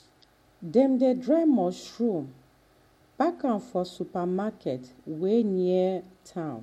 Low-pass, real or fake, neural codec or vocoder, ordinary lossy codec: 19.8 kHz; real; none; MP3, 64 kbps